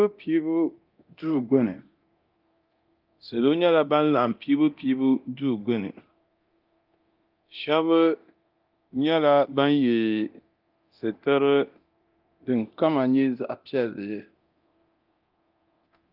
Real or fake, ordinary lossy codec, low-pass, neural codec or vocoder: fake; Opus, 24 kbps; 5.4 kHz; codec, 24 kHz, 0.9 kbps, DualCodec